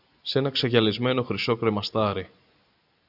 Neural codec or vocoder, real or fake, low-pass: none; real; 5.4 kHz